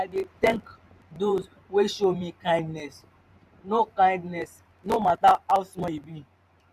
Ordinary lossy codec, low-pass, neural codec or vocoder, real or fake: MP3, 96 kbps; 14.4 kHz; vocoder, 44.1 kHz, 128 mel bands every 512 samples, BigVGAN v2; fake